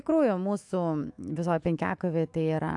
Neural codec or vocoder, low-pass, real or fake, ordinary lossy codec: autoencoder, 48 kHz, 128 numbers a frame, DAC-VAE, trained on Japanese speech; 10.8 kHz; fake; AAC, 64 kbps